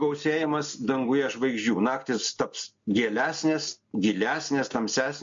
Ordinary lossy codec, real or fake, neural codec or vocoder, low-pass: MP3, 48 kbps; real; none; 7.2 kHz